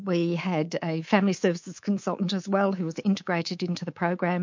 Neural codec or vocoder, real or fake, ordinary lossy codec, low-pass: codec, 24 kHz, 3.1 kbps, DualCodec; fake; MP3, 48 kbps; 7.2 kHz